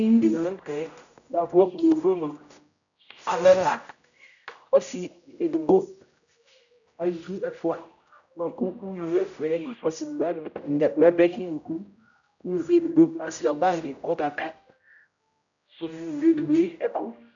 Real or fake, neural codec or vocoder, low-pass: fake; codec, 16 kHz, 0.5 kbps, X-Codec, HuBERT features, trained on general audio; 7.2 kHz